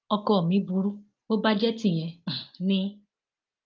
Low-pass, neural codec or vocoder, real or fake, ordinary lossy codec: 7.2 kHz; none; real; Opus, 24 kbps